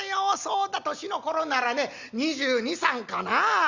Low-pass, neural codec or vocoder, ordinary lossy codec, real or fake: 7.2 kHz; none; Opus, 64 kbps; real